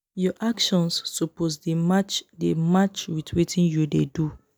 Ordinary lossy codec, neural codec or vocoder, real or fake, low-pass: none; none; real; none